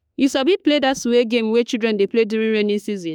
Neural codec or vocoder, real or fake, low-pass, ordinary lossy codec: autoencoder, 48 kHz, 32 numbers a frame, DAC-VAE, trained on Japanese speech; fake; none; none